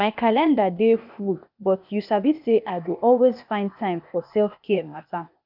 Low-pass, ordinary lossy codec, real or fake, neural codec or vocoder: 5.4 kHz; none; fake; codec, 16 kHz, 0.8 kbps, ZipCodec